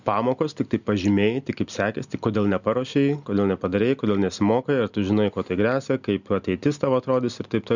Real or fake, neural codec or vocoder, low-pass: real; none; 7.2 kHz